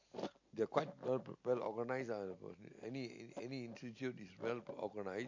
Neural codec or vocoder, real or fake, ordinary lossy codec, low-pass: none; real; MP3, 48 kbps; 7.2 kHz